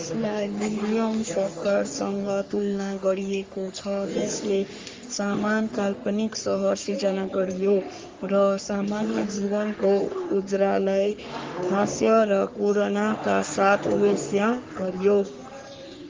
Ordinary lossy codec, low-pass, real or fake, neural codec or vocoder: Opus, 32 kbps; 7.2 kHz; fake; codec, 44.1 kHz, 3.4 kbps, Pupu-Codec